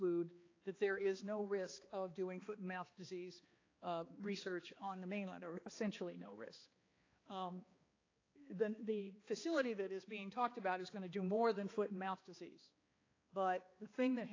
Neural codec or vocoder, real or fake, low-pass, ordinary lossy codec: codec, 16 kHz, 2 kbps, X-Codec, HuBERT features, trained on balanced general audio; fake; 7.2 kHz; AAC, 32 kbps